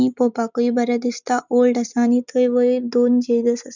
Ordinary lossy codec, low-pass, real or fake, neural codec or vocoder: none; 7.2 kHz; real; none